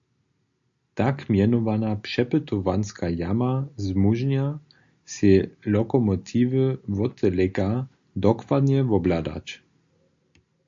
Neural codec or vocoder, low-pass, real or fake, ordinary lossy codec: none; 7.2 kHz; real; AAC, 64 kbps